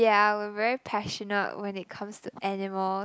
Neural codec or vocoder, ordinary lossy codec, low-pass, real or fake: none; none; none; real